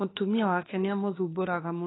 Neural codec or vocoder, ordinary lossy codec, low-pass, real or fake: autoencoder, 48 kHz, 32 numbers a frame, DAC-VAE, trained on Japanese speech; AAC, 16 kbps; 7.2 kHz; fake